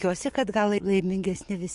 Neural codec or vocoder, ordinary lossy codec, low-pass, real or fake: codec, 44.1 kHz, 7.8 kbps, DAC; MP3, 48 kbps; 14.4 kHz; fake